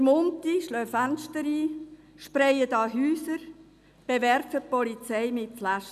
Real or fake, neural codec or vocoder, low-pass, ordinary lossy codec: real; none; 14.4 kHz; none